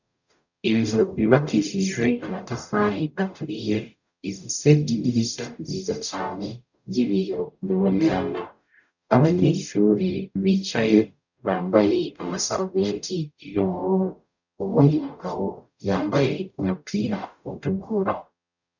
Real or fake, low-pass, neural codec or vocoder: fake; 7.2 kHz; codec, 44.1 kHz, 0.9 kbps, DAC